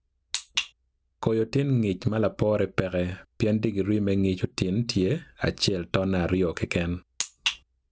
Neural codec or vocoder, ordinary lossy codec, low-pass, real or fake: none; none; none; real